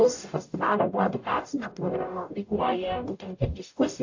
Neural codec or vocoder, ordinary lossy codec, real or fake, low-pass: codec, 44.1 kHz, 0.9 kbps, DAC; AAC, 48 kbps; fake; 7.2 kHz